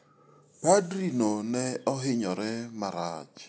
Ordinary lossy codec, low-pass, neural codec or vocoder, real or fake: none; none; none; real